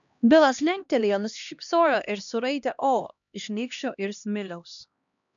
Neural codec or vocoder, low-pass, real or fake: codec, 16 kHz, 1 kbps, X-Codec, HuBERT features, trained on LibriSpeech; 7.2 kHz; fake